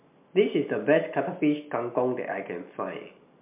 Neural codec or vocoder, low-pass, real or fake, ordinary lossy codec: none; 3.6 kHz; real; MP3, 32 kbps